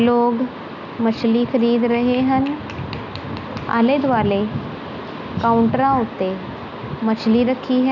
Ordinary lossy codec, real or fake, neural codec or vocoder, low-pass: none; real; none; 7.2 kHz